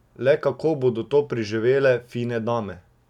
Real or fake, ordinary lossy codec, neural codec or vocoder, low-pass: real; none; none; 19.8 kHz